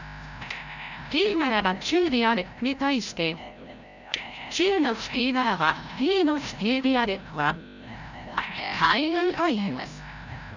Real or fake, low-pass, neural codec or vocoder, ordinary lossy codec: fake; 7.2 kHz; codec, 16 kHz, 0.5 kbps, FreqCodec, larger model; none